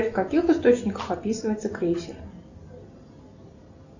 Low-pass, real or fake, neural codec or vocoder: 7.2 kHz; real; none